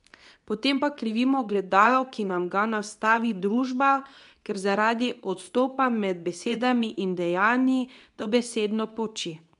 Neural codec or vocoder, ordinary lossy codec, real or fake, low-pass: codec, 24 kHz, 0.9 kbps, WavTokenizer, medium speech release version 2; none; fake; 10.8 kHz